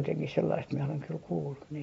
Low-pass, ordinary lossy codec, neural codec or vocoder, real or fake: 19.8 kHz; AAC, 24 kbps; none; real